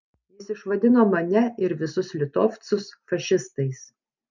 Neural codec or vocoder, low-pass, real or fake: none; 7.2 kHz; real